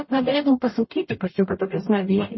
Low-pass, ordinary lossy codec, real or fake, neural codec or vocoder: 7.2 kHz; MP3, 24 kbps; fake; codec, 44.1 kHz, 0.9 kbps, DAC